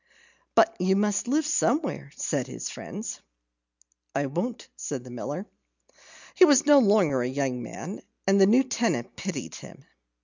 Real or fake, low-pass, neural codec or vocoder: real; 7.2 kHz; none